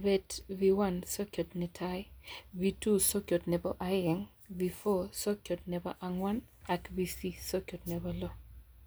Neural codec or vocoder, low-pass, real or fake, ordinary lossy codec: none; none; real; none